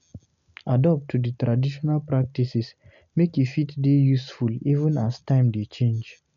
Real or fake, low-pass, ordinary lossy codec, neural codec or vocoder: real; 7.2 kHz; none; none